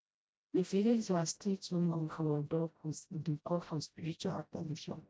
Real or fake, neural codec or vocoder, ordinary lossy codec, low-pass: fake; codec, 16 kHz, 0.5 kbps, FreqCodec, smaller model; none; none